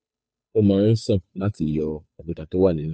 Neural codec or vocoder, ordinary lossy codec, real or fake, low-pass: codec, 16 kHz, 2 kbps, FunCodec, trained on Chinese and English, 25 frames a second; none; fake; none